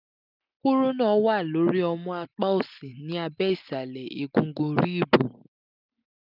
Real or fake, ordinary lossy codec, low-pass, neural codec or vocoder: real; none; 5.4 kHz; none